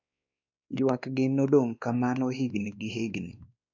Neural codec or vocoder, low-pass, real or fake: codec, 16 kHz, 2 kbps, X-Codec, WavLM features, trained on Multilingual LibriSpeech; 7.2 kHz; fake